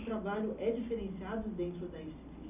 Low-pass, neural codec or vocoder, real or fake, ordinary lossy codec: 3.6 kHz; none; real; none